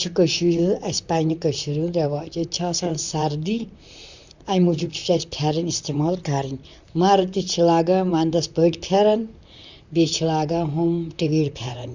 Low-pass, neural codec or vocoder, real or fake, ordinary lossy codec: 7.2 kHz; vocoder, 44.1 kHz, 128 mel bands, Pupu-Vocoder; fake; Opus, 64 kbps